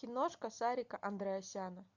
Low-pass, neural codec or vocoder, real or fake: 7.2 kHz; none; real